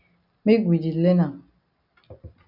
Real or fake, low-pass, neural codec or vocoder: real; 5.4 kHz; none